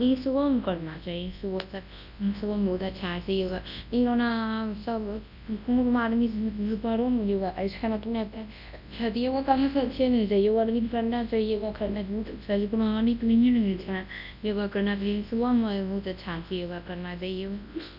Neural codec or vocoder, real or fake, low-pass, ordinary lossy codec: codec, 24 kHz, 0.9 kbps, WavTokenizer, large speech release; fake; 5.4 kHz; none